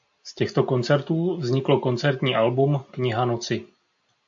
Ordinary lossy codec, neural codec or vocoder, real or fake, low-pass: AAC, 64 kbps; none; real; 7.2 kHz